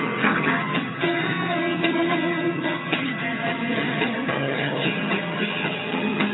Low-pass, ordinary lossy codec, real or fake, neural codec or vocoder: 7.2 kHz; AAC, 16 kbps; fake; vocoder, 22.05 kHz, 80 mel bands, HiFi-GAN